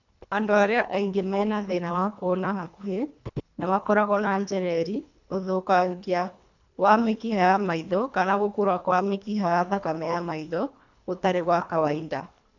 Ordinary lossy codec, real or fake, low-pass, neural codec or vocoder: none; fake; 7.2 kHz; codec, 24 kHz, 1.5 kbps, HILCodec